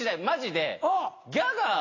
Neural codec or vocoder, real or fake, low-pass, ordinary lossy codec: none; real; 7.2 kHz; AAC, 32 kbps